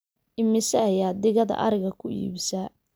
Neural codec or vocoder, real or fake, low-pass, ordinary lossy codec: none; real; none; none